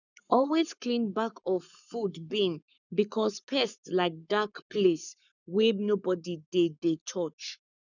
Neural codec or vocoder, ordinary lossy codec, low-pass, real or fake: codec, 44.1 kHz, 7.8 kbps, Pupu-Codec; none; 7.2 kHz; fake